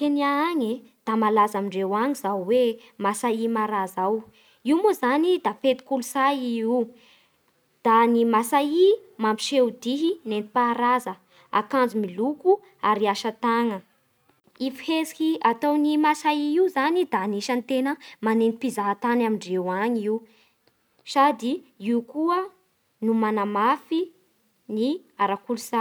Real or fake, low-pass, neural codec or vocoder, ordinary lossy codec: real; none; none; none